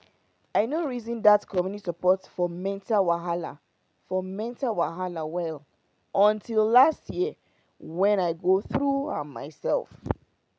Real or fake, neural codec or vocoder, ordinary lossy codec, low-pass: real; none; none; none